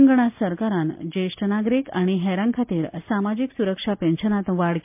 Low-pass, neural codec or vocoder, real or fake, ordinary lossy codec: 3.6 kHz; none; real; MP3, 32 kbps